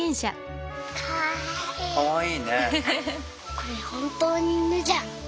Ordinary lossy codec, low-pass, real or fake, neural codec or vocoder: none; none; real; none